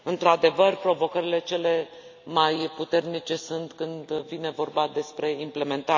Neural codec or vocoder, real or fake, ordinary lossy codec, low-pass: none; real; AAC, 48 kbps; 7.2 kHz